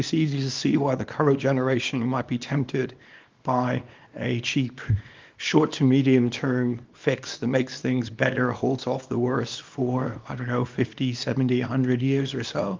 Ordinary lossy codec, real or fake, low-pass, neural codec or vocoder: Opus, 32 kbps; fake; 7.2 kHz; codec, 24 kHz, 0.9 kbps, WavTokenizer, small release